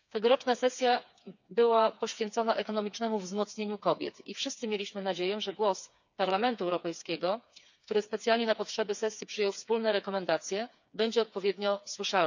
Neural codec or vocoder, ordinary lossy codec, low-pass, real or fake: codec, 16 kHz, 4 kbps, FreqCodec, smaller model; none; 7.2 kHz; fake